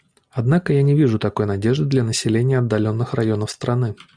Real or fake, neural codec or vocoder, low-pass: real; none; 9.9 kHz